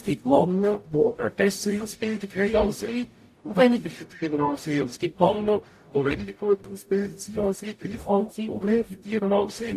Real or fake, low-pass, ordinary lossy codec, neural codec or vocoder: fake; 14.4 kHz; AAC, 64 kbps; codec, 44.1 kHz, 0.9 kbps, DAC